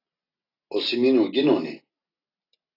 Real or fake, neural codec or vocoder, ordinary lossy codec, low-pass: real; none; AAC, 24 kbps; 5.4 kHz